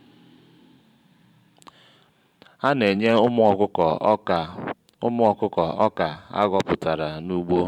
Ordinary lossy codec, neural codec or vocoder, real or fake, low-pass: none; vocoder, 44.1 kHz, 128 mel bands every 512 samples, BigVGAN v2; fake; 19.8 kHz